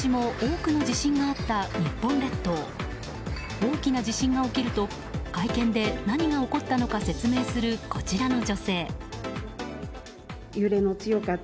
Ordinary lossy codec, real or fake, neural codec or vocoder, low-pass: none; real; none; none